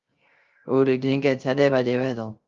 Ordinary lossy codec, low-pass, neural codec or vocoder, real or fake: Opus, 32 kbps; 7.2 kHz; codec, 16 kHz, 0.3 kbps, FocalCodec; fake